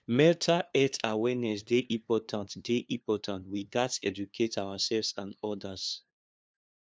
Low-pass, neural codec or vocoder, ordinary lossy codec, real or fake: none; codec, 16 kHz, 2 kbps, FunCodec, trained on LibriTTS, 25 frames a second; none; fake